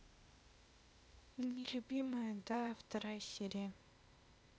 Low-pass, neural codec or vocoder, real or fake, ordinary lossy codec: none; codec, 16 kHz, 0.8 kbps, ZipCodec; fake; none